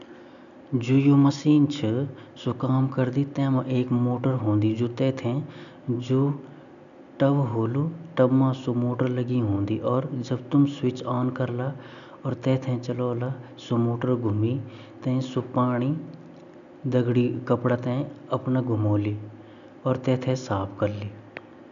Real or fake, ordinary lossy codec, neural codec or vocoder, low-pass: real; none; none; 7.2 kHz